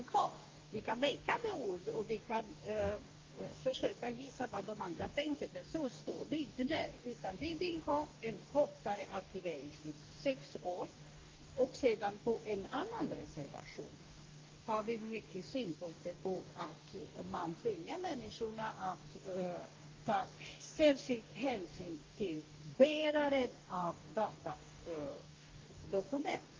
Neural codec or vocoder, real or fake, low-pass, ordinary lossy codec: codec, 44.1 kHz, 2.6 kbps, DAC; fake; 7.2 kHz; Opus, 32 kbps